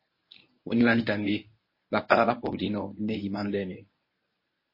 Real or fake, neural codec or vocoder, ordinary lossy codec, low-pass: fake; codec, 24 kHz, 0.9 kbps, WavTokenizer, medium speech release version 1; MP3, 24 kbps; 5.4 kHz